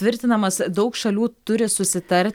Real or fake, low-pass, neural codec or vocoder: real; 19.8 kHz; none